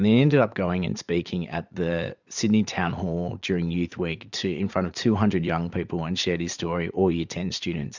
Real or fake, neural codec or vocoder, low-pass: fake; vocoder, 44.1 kHz, 80 mel bands, Vocos; 7.2 kHz